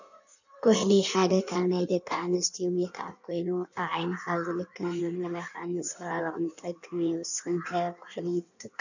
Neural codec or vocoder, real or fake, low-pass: codec, 16 kHz in and 24 kHz out, 1.1 kbps, FireRedTTS-2 codec; fake; 7.2 kHz